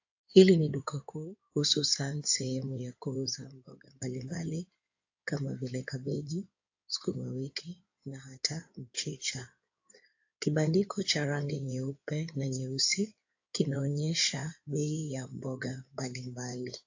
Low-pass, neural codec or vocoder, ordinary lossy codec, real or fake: 7.2 kHz; codec, 16 kHz in and 24 kHz out, 2.2 kbps, FireRedTTS-2 codec; AAC, 48 kbps; fake